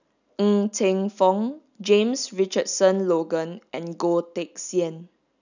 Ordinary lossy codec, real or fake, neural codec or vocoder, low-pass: none; real; none; 7.2 kHz